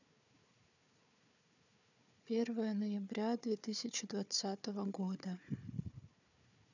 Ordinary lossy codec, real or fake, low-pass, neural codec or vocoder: none; fake; 7.2 kHz; codec, 16 kHz, 4 kbps, FunCodec, trained on Chinese and English, 50 frames a second